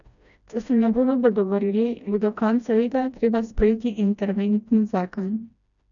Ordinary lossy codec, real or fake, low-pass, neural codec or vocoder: none; fake; 7.2 kHz; codec, 16 kHz, 1 kbps, FreqCodec, smaller model